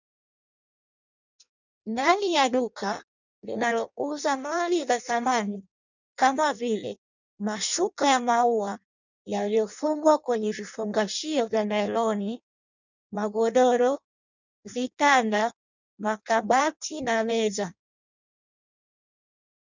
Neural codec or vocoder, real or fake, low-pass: codec, 16 kHz in and 24 kHz out, 0.6 kbps, FireRedTTS-2 codec; fake; 7.2 kHz